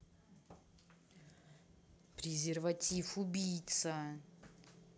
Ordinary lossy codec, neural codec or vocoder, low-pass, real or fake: none; none; none; real